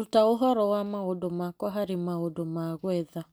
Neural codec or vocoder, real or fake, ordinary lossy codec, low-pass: none; real; none; none